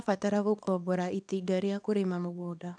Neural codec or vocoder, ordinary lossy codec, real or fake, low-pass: codec, 24 kHz, 0.9 kbps, WavTokenizer, small release; none; fake; 9.9 kHz